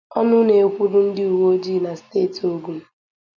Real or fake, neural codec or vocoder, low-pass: real; none; 7.2 kHz